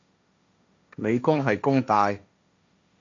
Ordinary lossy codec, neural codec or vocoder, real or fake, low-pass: AAC, 64 kbps; codec, 16 kHz, 1.1 kbps, Voila-Tokenizer; fake; 7.2 kHz